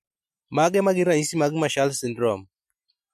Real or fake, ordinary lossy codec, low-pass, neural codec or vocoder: real; MP3, 64 kbps; 14.4 kHz; none